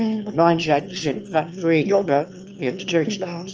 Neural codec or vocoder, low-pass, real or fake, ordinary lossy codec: autoencoder, 22.05 kHz, a latent of 192 numbers a frame, VITS, trained on one speaker; 7.2 kHz; fake; Opus, 24 kbps